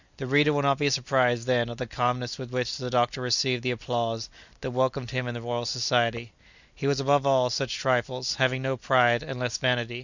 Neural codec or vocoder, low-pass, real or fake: none; 7.2 kHz; real